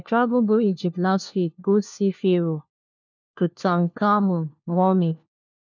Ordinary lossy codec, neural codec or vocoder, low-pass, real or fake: none; codec, 16 kHz, 1 kbps, FunCodec, trained on LibriTTS, 50 frames a second; 7.2 kHz; fake